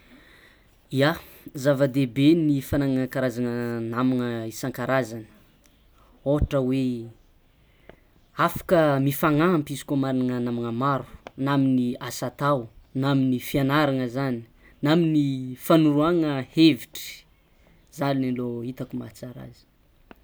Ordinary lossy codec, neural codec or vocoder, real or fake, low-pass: none; none; real; none